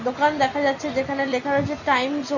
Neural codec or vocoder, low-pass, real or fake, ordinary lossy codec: none; 7.2 kHz; real; none